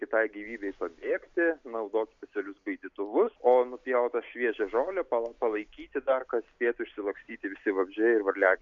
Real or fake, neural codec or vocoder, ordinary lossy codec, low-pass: real; none; MP3, 64 kbps; 7.2 kHz